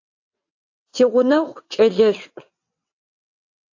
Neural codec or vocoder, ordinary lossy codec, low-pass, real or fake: vocoder, 22.05 kHz, 80 mel bands, WaveNeXt; AAC, 48 kbps; 7.2 kHz; fake